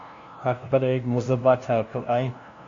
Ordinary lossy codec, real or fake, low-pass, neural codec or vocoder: AAC, 48 kbps; fake; 7.2 kHz; codec, 16 kHz, 0.5 kbps, FunCodec, trained on LibriTTS, 25 frames a second